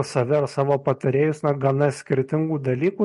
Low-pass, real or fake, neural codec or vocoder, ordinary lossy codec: 14.4 kHz; real; none; MP3, 48 kbps